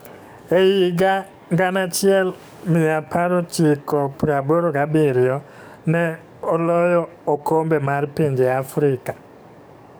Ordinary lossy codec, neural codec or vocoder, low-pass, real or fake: none; codec, 44.1 kHz, 7.8 kbps, DAC; none; fake